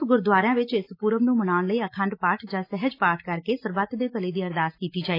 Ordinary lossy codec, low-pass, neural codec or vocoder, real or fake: AAC, 32 kbps; 5.4 kHz; none; real